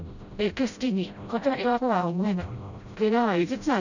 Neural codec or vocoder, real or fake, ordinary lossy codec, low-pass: codec, 16 kHz, 0.5 kbps, FreqCodec, smaller model; fake; none; 7.2 kHz